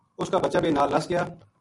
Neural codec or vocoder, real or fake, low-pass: none; real; 10.8 kHz